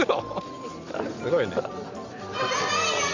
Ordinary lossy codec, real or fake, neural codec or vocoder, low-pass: MP3, 64 kbps; real; none; 7.2 kHz